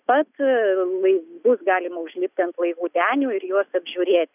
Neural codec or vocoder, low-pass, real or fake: none; 3.6 kHz; real